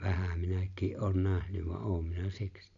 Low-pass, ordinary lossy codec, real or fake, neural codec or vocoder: 7.2 kHz; none; real; none